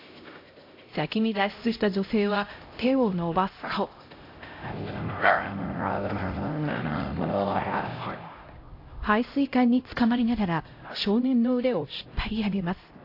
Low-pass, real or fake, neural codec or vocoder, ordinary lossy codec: 5.4 kHz; fake; codec, 16 kHz, 0.5 kbps, X-Codec, HuBERT features, trained on LibriSpeech; AAC, 32 kbps